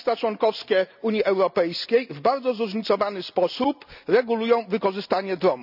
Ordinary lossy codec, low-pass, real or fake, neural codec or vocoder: none; 5.4 kHz; real; none